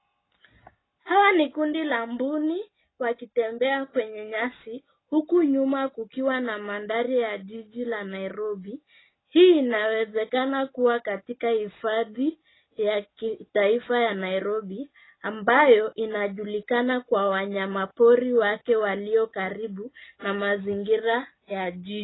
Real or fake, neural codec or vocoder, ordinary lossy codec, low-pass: real; none; AAC, 16 kbps; 7.2 kHz